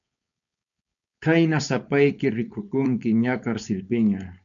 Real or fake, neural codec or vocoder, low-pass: fake; codec, 16 kHz, 4.8 kbps, FACodec; 7.2 kHz